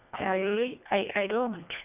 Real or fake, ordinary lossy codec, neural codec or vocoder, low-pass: fake; none; codec, 24 kHz, 1.5 kbps, HILCodec; 3.6 kHz